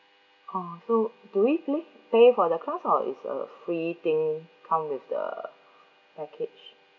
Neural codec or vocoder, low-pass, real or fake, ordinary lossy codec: none; 7.2 kHz; real; none